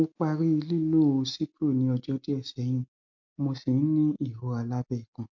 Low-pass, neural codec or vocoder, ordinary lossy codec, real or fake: 7.2 kHz; none; MP3, 48 kbps; real